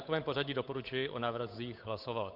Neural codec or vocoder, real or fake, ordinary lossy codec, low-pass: codec, 16 kHz, 8 kbps, FunCodec, trained on Chinese and English, 25 frames a second; fake; MP3, 48 kbps; 5.4 kHz